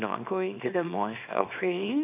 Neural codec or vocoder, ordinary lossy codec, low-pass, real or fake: codec, 24 kHz, 0.9 kbps, WavTokenizer, small release; none; 3.6 kHz; fake